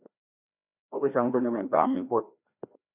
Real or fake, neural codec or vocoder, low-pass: fake; codec, 16 kHz, 1 kbps, FreqCodec, larger model; 3.6 kHz